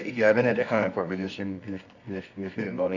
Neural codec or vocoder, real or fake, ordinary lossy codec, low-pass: codec, 24 kHz, 0.9 kbps, WavTokenizer, medium music audio release; fake; none; 7.2 kHz